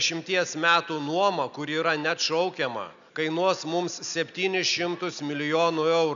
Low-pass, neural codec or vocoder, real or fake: 7.2 kHz; none; real